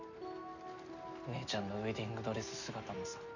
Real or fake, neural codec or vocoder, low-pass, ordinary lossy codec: real; none; 7.2 kHz; none